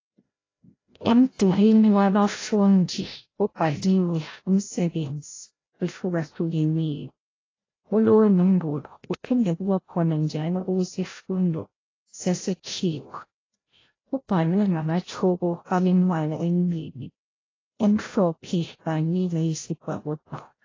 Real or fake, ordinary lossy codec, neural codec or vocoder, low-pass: fake; AAC, 32 kbps; codec, 16 kHz, 0.5 kbps, FreqCodec, larger model; 7.2 kHz